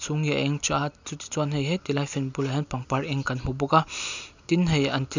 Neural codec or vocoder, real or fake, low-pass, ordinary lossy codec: none; real; 7.2 kHz; none